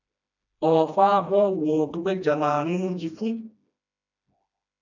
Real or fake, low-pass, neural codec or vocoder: fake; 7.2 kHz; codec, 16 kHz, 1 kbps, FreqCodec, smaller model